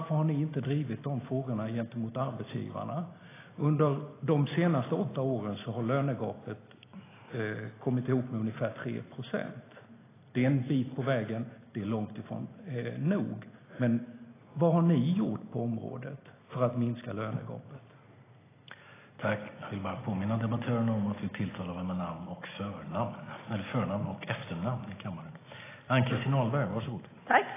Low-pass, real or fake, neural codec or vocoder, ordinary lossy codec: 3.6 kHz; real; none; AAC, 16 kbps